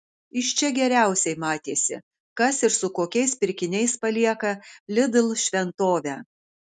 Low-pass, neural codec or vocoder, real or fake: 10.8 kHz; none; real